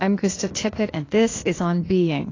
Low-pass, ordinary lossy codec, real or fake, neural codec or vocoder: 7.2 kHz; AAC, 32 kbps; fake; codec, 16 kHz, 0.8 kbps, ZipCodec